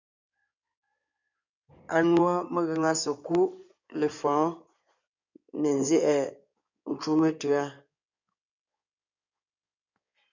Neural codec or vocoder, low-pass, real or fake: codec, 16 kHz in and 24 kHz out, 2.2 kbps, FireRedTTS-2 codec; 7.2 kHz; fake